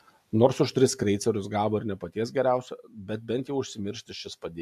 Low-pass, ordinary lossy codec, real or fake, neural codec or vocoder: 14.4 kHz; AAC, 96 kbps; real; none